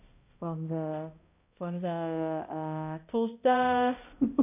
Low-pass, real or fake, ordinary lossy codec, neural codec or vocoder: 3.6 kHz; fake; AAC, 32 kbps; codec, 16 kHz, 0.5 kbps, X-Codec, HuBERT features, trained on balanced general audio